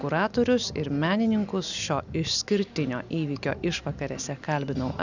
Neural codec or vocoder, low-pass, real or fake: none; 7.2 kHz; real